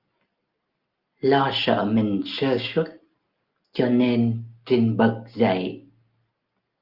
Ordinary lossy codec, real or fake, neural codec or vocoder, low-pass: Opus, 24 kbps; real; none; 5.4 kHz